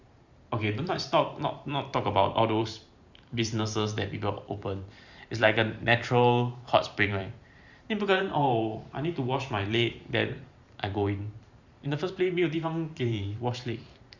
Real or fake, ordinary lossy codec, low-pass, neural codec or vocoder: fake; Opus, 64 kbps; 7.2 kHz; vocoder, 44.1 kHz, 128 mel bands every 512 samples, BigVGAN v2